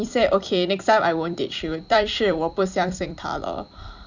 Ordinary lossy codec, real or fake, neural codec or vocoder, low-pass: none; fake; vocoder, 44.1 kHz, 128 mel bands every 256 samples, BigVGAN v2; 7.2 kHz